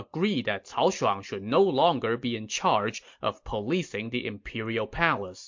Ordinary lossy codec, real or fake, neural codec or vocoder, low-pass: MP3, 48 kbps; real; none; 7.2 kHz